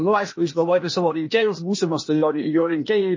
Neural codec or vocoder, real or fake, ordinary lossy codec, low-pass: codec, 16 kHz, 0.8 kbps, ZipCodec; fake; MP3, 32 kbps; 7.2 kHz